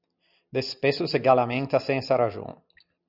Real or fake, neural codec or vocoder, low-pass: real; none; 5.4 kHz